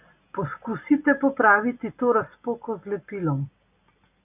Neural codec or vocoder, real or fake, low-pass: none; real; 3.6 kHz